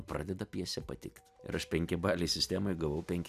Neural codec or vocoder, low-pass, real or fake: none; 14.4 kHz; real